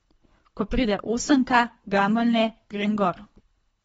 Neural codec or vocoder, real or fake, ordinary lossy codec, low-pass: codec, 24 kHz, 3 kbps, HILCodec; fake; AAC, 24 kbps; 10.8 kHz